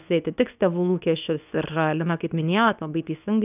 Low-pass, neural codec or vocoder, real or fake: 3.6 kHz; codec, 24 kHz, 0.9 kbps, WavTokenizer, medium speech release version 2; fake